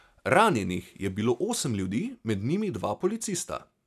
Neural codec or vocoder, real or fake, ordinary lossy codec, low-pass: none; real; none; 14.4 kHz